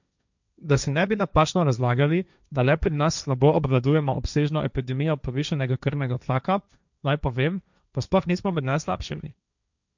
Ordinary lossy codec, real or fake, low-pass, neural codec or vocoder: none; fake; 7.2 kHz; codec, 16 kHz, 1.1 kbps, Voila-Tokenizer